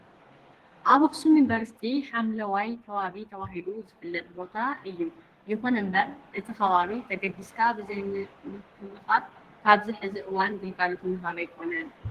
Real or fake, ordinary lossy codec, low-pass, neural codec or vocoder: fake; Opus, 16 kbps; 14.4 kHz; codec, 32 kHz, 1.9 kbps, SNAC